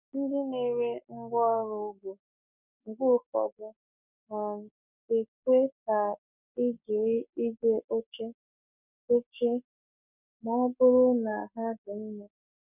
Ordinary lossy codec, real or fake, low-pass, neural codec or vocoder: none; fake; 3.6 kHz; codec, 44.1 kHz, 7.8 kbps, Pupu-Codec